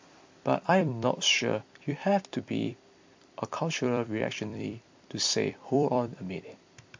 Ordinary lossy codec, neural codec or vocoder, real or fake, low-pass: MP3, 48 kbps; vocoder, 44.1 kHz, 128 mel bands every 256 samples, BigVGAN v2; fake; 7.2 kHz